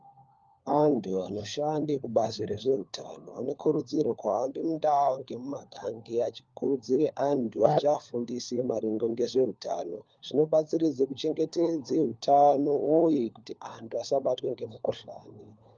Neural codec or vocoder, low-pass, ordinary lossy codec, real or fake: codec, 16 kHz, 4 kbps, FunCodec, trained on LibriTTS, 50 frames a second; 7.2 kHz; Opus, 32 kbps; fake